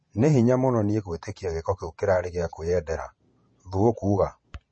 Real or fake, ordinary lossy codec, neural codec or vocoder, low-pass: fake; MP3, 32 kbps; vocoder, 44.1 kHz, 128 mel bands every 512 samples, BigVGAN v2; 9.9 kHz